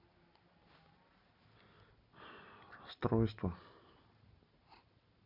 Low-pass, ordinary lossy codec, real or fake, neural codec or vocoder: 5.4 kHz; none; real; none